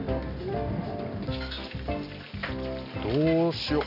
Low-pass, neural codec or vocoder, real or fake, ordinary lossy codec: 5.4 kHz; none; real; none